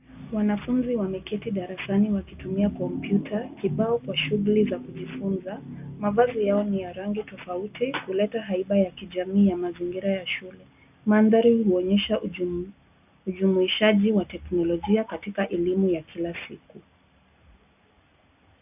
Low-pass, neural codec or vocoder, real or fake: 3.6 kHz; none; real